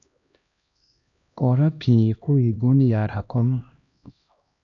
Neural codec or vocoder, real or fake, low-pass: codec, 16 kHz, 1 kbps, X-Codec, HuBERT features, trained on LibriSpeech; fake; 7.2 kHz